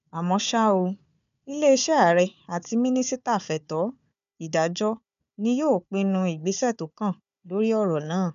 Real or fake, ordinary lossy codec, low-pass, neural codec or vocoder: fake; none; 7.2 kHz; codec, 16 kHz, 4 kbps, FunCodec, trained on Chinese and English, 50 frames a second